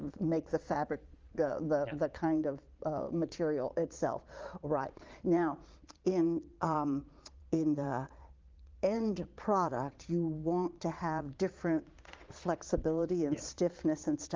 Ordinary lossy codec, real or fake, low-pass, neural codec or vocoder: Opus, 32 kbps; fake; 7.2 kHz; vocoder, 22.05 kHz, 80 mel bands, Vocos